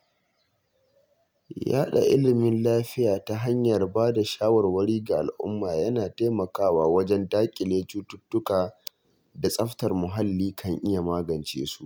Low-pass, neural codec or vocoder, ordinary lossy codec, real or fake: none; none; none; real